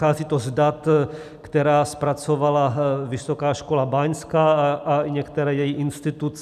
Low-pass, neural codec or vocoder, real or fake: 14.4 kHz; none; real